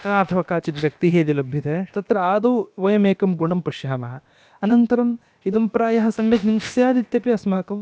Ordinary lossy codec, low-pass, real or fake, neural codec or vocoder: none; none; fake; codec, 16 kHz, about 1 kbps, DyCAST, with the encoder's durations